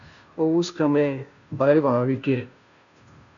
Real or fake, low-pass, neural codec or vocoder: fake; 7.2 kHz; codec, 16 kHz, 0.5 kbps, FunCodec, trained on Chinese and English, 25 frames a second